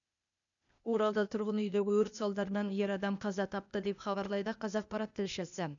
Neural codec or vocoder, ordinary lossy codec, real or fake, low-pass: codec, 16 kHz, 0.8 kbps, ZipCodec; none; fake; 7.2 kHz